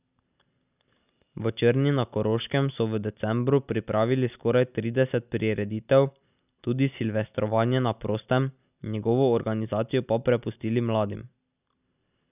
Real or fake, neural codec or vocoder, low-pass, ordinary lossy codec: real; none; 3.6 kHz; none